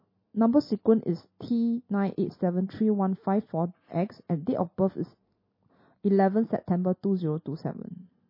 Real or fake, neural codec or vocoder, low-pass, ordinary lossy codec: real; none; 5.4 kHz; MP3, 24 kbps